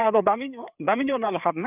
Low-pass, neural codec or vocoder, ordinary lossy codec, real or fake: 3.6 kHz; codec, 16 kHz, 4 kbps, FreqCodec, larger model; none; fake